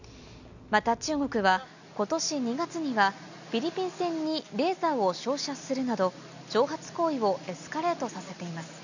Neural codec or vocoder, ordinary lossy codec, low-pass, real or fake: none; none; 7.2 kHz; real